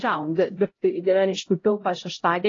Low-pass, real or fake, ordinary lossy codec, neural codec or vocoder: 7.2 kHz; fake; AAC, 32 kbps; codec, 16 kHz, 0.5 kbps, X-Codec, HuBERT features, trained on LibriSpeech